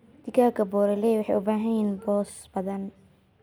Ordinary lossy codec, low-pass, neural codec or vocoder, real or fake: none; none; none; real